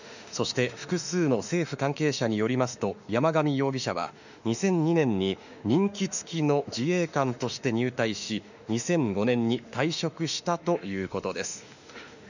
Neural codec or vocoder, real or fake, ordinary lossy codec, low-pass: autoencoder, 48 kHz, 32 numbers a frame, DAC-VAE, trained on Japanese speech; fake; none; 7.2 kHz